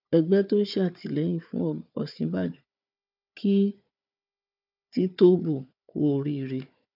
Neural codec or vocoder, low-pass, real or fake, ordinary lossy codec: codec, 16 kHz, 4 kbps, FunCodec, trained on Chinese and English, 50 frames a second; 5.4 kHz; fake; none